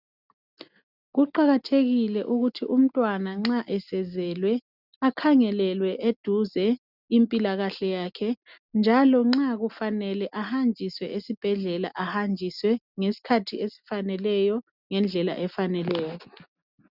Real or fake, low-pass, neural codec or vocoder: real; 5.4 kHz; none